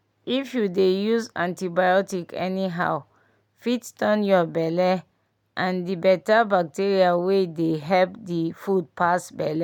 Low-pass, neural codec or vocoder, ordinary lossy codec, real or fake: 19.8 kHz; none; none; real